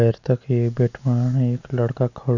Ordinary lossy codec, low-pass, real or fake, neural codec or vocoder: none; 7.2 kHz; real; none